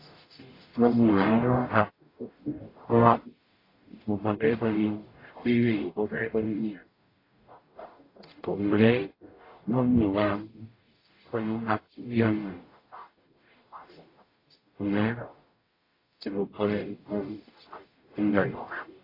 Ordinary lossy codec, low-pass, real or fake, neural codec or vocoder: AAC, 24 kbps; 5.4 kHz; fake; codec, 44.1 kHz, 0.9 kbps, DAC